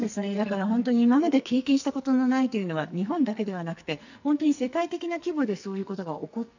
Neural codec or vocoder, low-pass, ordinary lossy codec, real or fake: codec, 44.1 kHz, 2.6 kbps, SNAC; 7.2 kHz; none; fake